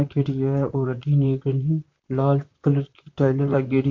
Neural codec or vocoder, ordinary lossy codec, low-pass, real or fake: vocoder, 44.1 kHz, 128 mel bands, Pupu-Vocoder; MP3, 48 kbps; 7.2 kHz; fake